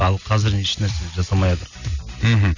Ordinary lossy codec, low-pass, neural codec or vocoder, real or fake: none; 7.2 kHz; none; real